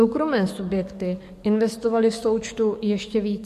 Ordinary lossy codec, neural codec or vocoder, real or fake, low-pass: MP3, 64 kbps; codec, 44.1 kHz, 7.8 kbps, DAC; fake; 14.4 kHz